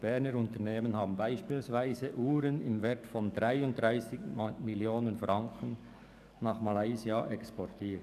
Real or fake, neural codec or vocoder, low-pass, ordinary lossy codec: fake; codec, 44.1 kHz, 7.8 kbps, DAC; 14.4 kHz; none